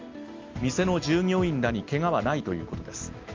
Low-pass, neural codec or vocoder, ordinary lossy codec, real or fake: 7.2 kHz; none; Opus, 32 kbps; real